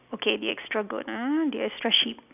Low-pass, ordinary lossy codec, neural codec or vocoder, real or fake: 3.6 kHz; none; none; real